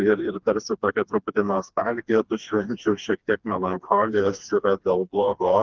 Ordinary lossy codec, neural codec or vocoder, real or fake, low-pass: Opus, 32 kbps; codec, 16 kHz, 2 kbps, FreqCodec, smaller model; fake; 7.2 kHz